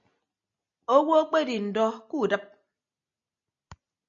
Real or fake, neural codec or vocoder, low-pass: real; none; 7.2 kHz